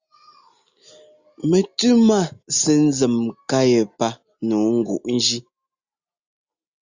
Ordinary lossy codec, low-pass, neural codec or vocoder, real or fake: Opus, 64 kbps; 7.2 kHz; none; real